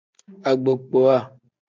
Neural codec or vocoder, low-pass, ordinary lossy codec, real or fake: none; 7.2 kHz; MP3, 64 kbps; real